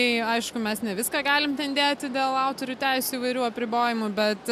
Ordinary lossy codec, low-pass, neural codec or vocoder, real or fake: AAC, 96 kbps; 14.4 kHz; none; real